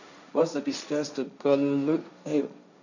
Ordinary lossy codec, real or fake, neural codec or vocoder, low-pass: none; fake; codec, 16 kHz, 1.1 kbps, Voila-Tokenizer; 7.2 kHz